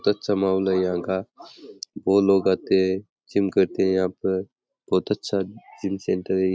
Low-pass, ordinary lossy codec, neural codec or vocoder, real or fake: none; none; none; real